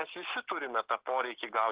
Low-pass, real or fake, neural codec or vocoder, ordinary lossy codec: 3.6 kHz; real; none; Opus, 16 kbps